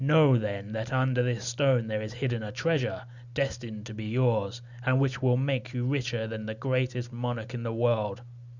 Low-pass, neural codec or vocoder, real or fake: 7.2 kHz; none; real